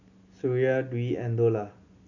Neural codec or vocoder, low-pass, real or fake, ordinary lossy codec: none; 7.2 kHz; real; none